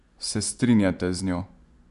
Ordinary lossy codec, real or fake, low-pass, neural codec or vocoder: none; fake; 10.8 kHz; vocoder, 24 kHz, 100 mel bands, Vocos